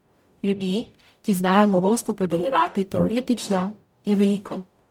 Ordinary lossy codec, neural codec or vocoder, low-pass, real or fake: MP3, 96 kbps; codec, 44.1 kHz, 0.9 kbps, DAC; 19.8 kHz; fake